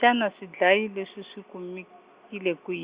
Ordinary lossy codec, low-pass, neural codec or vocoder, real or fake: Opus, 64 kbps; 3.6 kHz; none; real